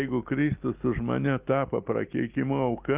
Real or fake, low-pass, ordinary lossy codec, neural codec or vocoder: real; 3.6 kHz; Opus, 32 kbps; none